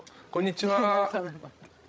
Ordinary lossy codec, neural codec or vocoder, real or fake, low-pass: none; codec, 16 kHz, 16 kbps, FreqCodec, larger model; fake; none